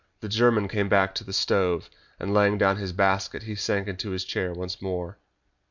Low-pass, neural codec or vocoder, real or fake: 7.2 kHz; none; real